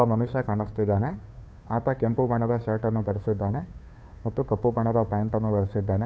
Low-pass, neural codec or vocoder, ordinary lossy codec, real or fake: none; codec, 16 kHz, 2 kbps, FunCodec, trained on Chinese and English, 25 frames a second; none; fake